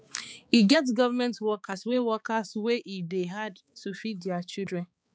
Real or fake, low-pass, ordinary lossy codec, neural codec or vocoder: fake; none; none; codec, 16 kHz, 4 kbps, X-Codec, HuBERT features, trained on balanced general audio